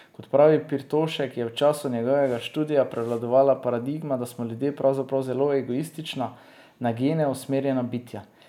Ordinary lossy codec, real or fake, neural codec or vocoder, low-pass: none; real; none; 19.8 kHz